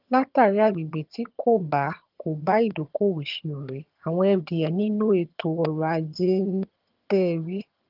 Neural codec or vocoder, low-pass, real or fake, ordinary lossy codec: vocoder, 22.05 kHz, 80 mel bands, HiFi-GAN; 5.4 kHz; fake; Opus, 32 kbps